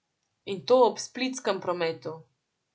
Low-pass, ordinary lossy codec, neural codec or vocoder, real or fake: none; none; none; real